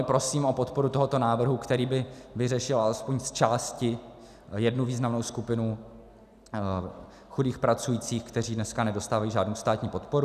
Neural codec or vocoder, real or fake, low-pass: none; real; 14.4 kHz